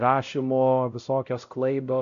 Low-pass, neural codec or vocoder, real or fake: 7.2 kHz; codec, 16 kHz, 0.5 kbps, X-Codec, WavLM features, trained on Multilingual LibriSpeech; fake